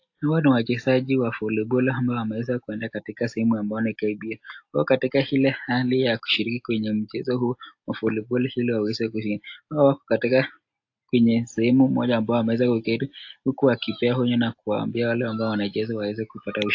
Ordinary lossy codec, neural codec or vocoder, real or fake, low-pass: AAC, 48 kbps; none; real; 7.2 kHz